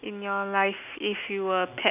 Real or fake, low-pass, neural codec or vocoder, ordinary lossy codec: real; 3.6 kHz; none; none